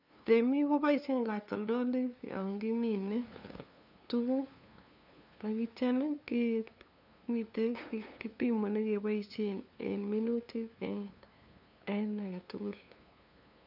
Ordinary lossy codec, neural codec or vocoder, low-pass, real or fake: none; codec, 16 kHz, 2 kbps, FunCodec, trained on LibriTTS, 25 frames a second; 5.4 kHz; fake